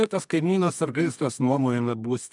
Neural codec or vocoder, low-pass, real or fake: codec, 24 kHz, 0.9 kbps, WavTokenizer, medium music audio release; 10.8 kHz; fake